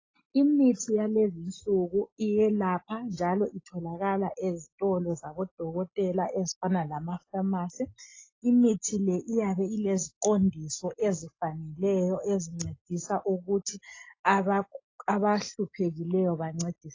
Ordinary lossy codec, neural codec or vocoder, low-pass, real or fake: AAC, 32 kbps; none; 7.2 kHz; real